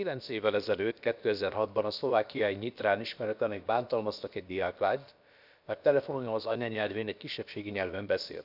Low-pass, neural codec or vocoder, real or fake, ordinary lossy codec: 5.4 kHz; codec, 16 kHz, about 1 kbps, DyCAST, with the encoder's durations; fake; none